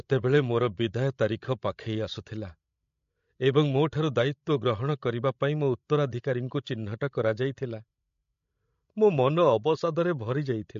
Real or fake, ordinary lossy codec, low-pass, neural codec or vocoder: fake; MP3, 48 kbps; 7.2 kHz; codec, 16 kHz, 16 kbps, FreqCodec, larger model